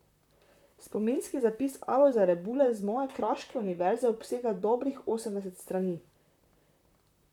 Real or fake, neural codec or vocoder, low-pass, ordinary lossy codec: fake; vocoder, 44.1 kHz, 128 mel bands, Pupu-Vocoder; 19.8 kHz; none